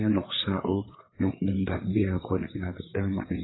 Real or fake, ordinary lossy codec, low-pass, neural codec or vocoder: fake; AAC, 16 kbps; 7.2 kHz; codec, 16 kHz, 4 kbps, FreqCodec, larger model